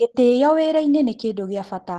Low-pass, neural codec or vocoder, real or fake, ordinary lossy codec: 10.8 kHz; none; real; Opus, 16 kbps